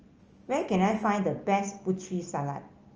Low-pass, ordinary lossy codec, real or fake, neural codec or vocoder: 7.2 kHz; Opus, 24 kbps; real; none